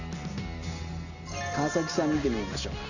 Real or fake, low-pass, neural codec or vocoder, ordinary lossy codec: real; 7.2 kHz; none; none